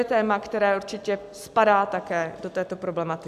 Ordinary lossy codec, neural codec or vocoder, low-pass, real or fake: AAC, 96 kbps; none; 14.4 kHz; real